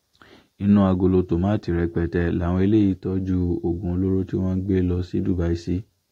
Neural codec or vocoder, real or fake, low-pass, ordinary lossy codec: none; real; 19.8 kHz; AAC, 48 kbps